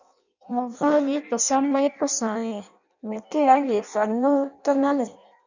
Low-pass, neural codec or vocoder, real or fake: 7.2 kHz; codec, 16 kHz in and 24 kHz out, 0.6 kbps, FireRedTTS-2 codec; fake